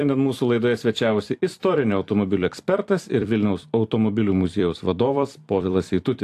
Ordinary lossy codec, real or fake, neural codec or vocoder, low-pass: AAC, 64 kbps; fake; vocoder, 44.1 kHz, 128 mel bands every 256 samples, BigVGAN v2; 14.4 kHz